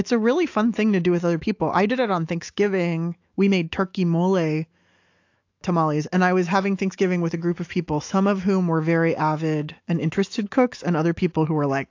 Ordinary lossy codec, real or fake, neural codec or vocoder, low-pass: AAC, 48 kbps; fake; autoencoder, 48 kHz, 128 numbers a frame, DAC-VAE, trained on Japanese speech; 7.2 kHz